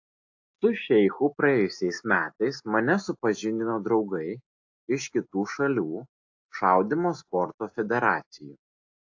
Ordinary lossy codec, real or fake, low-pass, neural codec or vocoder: AAC, 48 kbps; real; 7.2 kHz; none